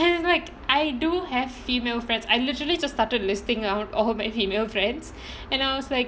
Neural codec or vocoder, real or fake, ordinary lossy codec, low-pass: none; real; none; none